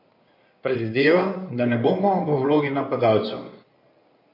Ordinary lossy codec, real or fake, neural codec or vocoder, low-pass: none; fake; codec, 16 kHz in and 24 kHz out, 2.2 kbps, FireRedTTS-2 codec; 5.4 kHz